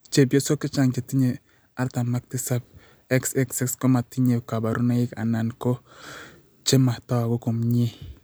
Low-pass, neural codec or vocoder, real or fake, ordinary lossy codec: none; none; real; none